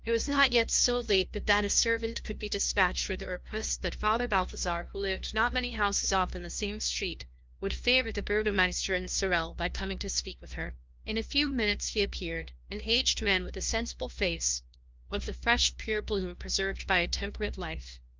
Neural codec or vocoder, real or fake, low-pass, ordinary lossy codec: codec, 16 kHz, 1 kbps, FunCodec, trained on LibriTTS, 50 frames a second; fake; 7.2 kHz; Opus, 24 kbps